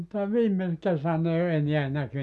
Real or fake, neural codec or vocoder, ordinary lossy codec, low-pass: real; none; none; none